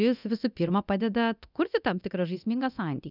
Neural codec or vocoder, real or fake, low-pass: codec, 24 kHz, 0.9 kbps, DualCodec; fake; 5.4 kHz